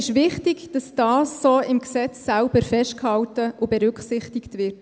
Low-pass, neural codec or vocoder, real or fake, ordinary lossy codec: none; none; real; none